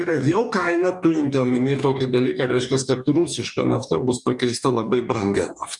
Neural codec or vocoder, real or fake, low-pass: codec, 44.1 kHz, 2.6 kbps, DAC; fake; 10.8 kHz